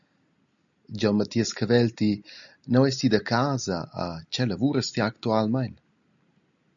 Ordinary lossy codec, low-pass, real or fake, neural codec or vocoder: MP3, 96 kbps; 7.2 kHz; real; none